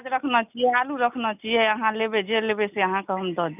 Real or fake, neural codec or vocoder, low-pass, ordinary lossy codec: real; none; 3.6 kHz; none